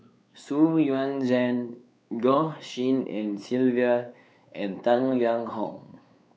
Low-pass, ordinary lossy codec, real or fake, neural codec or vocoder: none; none; fake; codec, 16 kHz, 4 kbps, X-Codec, WavLM features, trained on Multilingual LibriSpeech